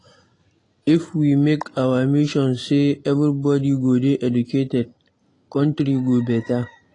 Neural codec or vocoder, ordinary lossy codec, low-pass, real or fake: none; AAC, 32 kbps; 10.8 kHz; real